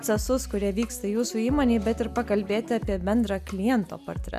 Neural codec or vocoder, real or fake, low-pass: none; real; 14.4 kHz